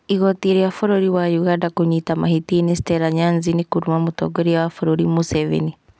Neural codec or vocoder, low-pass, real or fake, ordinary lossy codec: none; none; real; none